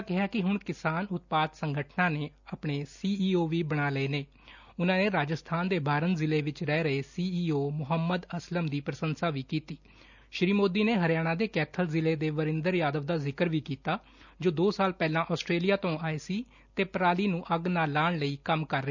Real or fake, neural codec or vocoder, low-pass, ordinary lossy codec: real; none; 7.2 kHz; none